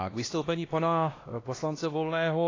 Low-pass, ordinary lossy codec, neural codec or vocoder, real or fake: 7.2 kHz; AAC, 32 kbps; codec, 16 kHz, 1 kbps, X-Codec, HuBERT features, trained on LibriSpeech; fake